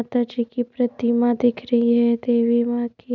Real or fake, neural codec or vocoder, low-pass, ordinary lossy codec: real; none; 7.2 kHz; none